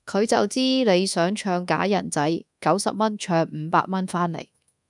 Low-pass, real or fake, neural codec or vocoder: 10.8 kHz; fake; codec, 24 kHz, 1.2 kbps, DualCodec